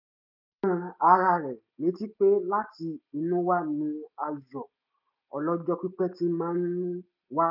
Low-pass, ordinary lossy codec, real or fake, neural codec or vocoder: 5.4 kHz; none; real; none